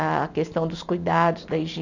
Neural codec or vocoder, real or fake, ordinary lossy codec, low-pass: none; real; none; 7.2 kHz